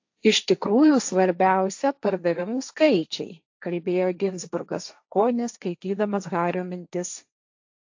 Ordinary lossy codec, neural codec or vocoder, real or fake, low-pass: AAC, 48 kbps; codec, 16 kHz, 1.1 kbps, Voila-Tokenizer; fake; 7.2 kHz